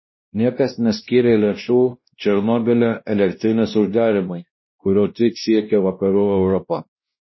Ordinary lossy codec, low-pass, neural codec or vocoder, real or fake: MP3, 24 kbps; 7.2 kHz; codec, 16 kHz, 1 kbps, X-Codec, WavLM features, trained on Multilingual LibriSpeech; fake